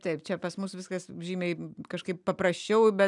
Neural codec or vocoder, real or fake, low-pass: none; real; 10.8 kHz